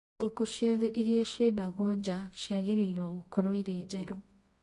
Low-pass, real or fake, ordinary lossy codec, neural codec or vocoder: 10.8 kHz; fake; AAC, 48 kbps; codec, 24 kHz, 0.9 kbps, WavTokenizer, medium music audio release